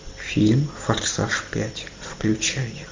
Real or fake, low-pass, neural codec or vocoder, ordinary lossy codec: real; 7.2 kHz; none; MP3, 64 kbps